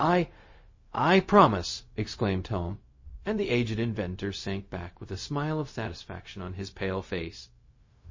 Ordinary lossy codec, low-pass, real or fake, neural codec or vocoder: MP3, 32 kbps; 7.2 kHz; fake; codec, 16 kHz, 0.4 kbps, LongCat-Audio-Codec